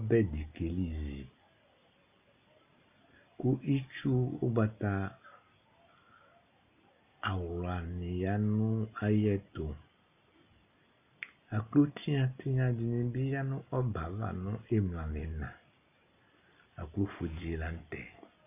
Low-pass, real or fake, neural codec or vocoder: 3.6 kHz; real; none